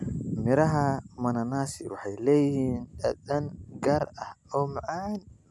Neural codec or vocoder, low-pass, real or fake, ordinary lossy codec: none; none; real; none